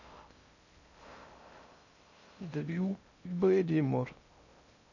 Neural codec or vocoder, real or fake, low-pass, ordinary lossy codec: codec, 16 kHz in and 24 kHz out, 0.6 kbps, FocalCodec, streaming, 2048 codes; fake; 7.2 kHz; none